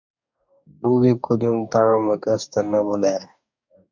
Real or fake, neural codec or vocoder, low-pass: fake; codec, 44.1 kHz, 2.6 kbps, DAC; 7.2 kHz